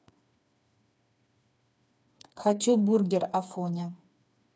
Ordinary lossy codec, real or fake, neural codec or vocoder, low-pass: none; fake; codec, 16 kHz, 4 kbps, FreqCodec, smaller model; none